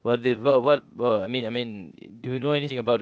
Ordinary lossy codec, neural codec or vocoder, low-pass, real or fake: none; codec, 16 kHz, 0.8 kbps, ZipCodec; none; fake